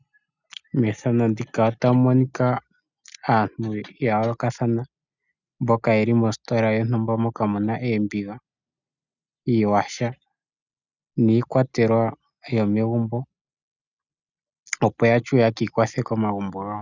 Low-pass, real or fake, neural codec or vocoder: 7.2 kHz; real; none